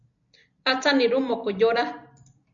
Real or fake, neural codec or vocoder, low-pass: real; none; 7.2 kHz